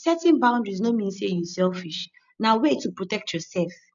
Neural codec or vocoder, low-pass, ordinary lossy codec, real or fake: none; 7.2 kHz; none; real